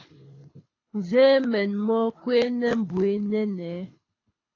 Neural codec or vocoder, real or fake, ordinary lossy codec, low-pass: codec, 24 kHz, 6 kbps, HILCodec; fake; AAC, 32 kbps; 7.2 kHz